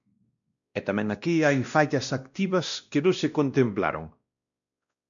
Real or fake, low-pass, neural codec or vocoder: fake; 7.2 kHz; codec, 16 kHz, 1 kbps, X-Codec, WavLM features, trained on Multilingual LibriSpeech